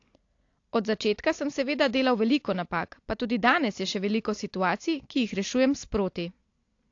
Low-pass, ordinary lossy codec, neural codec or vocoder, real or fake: 7.2 kHz; AAC, 48 kbps; none; real